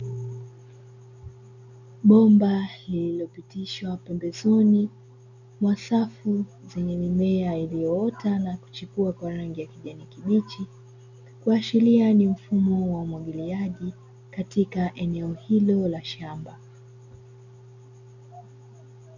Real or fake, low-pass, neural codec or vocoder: real; 7.2 kHz; none